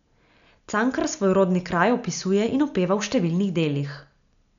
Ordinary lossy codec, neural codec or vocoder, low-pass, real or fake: none; none; 7.2 kHz; real